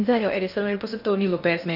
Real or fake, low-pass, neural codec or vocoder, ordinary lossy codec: fake; 5.4 kHz; codec, 16 kHz in and 24 kHz out, 0.6 kbps, FocalCodec, streaming, 2048 codes; none